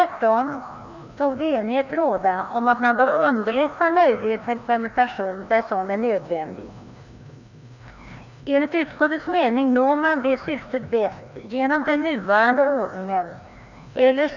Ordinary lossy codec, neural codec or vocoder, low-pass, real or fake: none; codec, 16 kHz, 1 kbps, FreqCodec, larger model; 7.2 kHz; fake